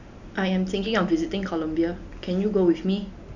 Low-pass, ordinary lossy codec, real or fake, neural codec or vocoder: 7.2 kHz; none; real; none